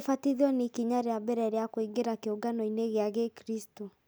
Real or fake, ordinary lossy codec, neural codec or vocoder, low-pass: real; none; none; none